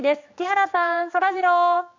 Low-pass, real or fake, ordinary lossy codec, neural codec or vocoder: 7.2 kHz; fake; MP3, 48 kbps; codec, 16 kHz, 2 kbps, X-Codec, HuBERT features, trained on general audio